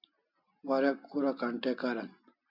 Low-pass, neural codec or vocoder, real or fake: 5.4 kHz; none; real